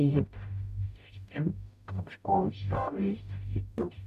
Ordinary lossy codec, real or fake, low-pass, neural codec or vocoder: none; fake; 14.4 kHz; codec, 44.1 kHz, 0.9 kbps, DAC